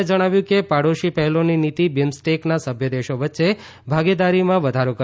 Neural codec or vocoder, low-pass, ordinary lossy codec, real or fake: none; none; none; real